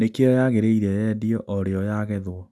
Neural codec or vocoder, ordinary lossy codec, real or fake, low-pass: none; none; real; none